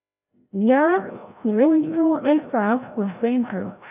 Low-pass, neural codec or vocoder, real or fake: 3.6 kHz; codec, 16 kHz, 0.5 kbps, FreqCodec, larger model; fake